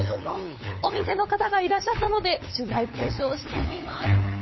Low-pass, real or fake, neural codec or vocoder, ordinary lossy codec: 7.2 kHz; fake; codec, 16 kHz, 8 kbps, FunCodec, trained on LibriTTS, 25 frames a second; MP3, 24 kbps